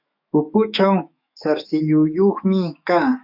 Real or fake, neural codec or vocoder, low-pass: fake; autoencoder, 48 kHz, 128 numbers a frame, DAC-VAE, trained on Japanese speech; 5.4 kHz